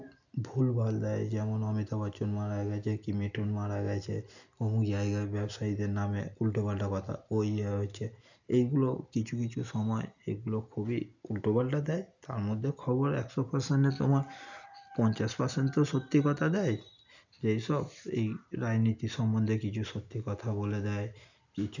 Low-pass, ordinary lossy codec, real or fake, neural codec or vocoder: 7.2 kHz; none; real; none